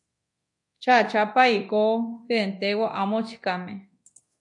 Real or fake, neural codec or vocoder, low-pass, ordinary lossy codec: fake; codec, 24 kHz, 0.9 kbps, DualCodec; 10.8 kHz; MP3, 48 kbps